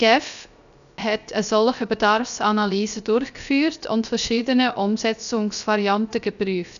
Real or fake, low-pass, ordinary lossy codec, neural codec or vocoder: fake; 7.2 kHz; none; codec, 16 kHz, 0.3 kbps, FocalCodec